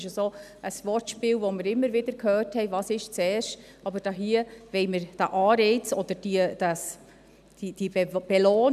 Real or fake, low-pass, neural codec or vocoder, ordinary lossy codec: real; 14.4 kHz; none; none